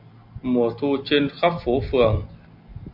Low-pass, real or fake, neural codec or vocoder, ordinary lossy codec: 5.4 kHz; real; none; MP3, 48 kbps